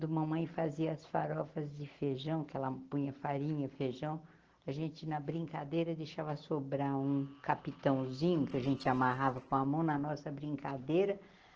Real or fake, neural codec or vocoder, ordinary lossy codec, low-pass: real; none; Opus, 16 kbps; 7.2 kHz